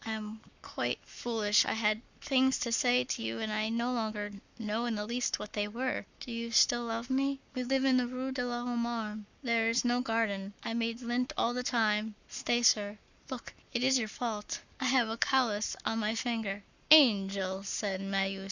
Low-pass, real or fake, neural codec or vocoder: 7.2 kHz; fake; codec, 44.1 kHz, 7.8 kbps, Pupu-Codec